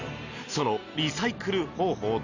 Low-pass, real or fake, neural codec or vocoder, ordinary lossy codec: 7.2 kHz; real; none; none